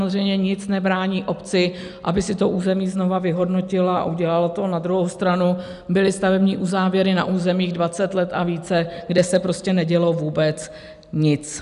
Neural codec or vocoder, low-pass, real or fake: none; 10.8 kHz; real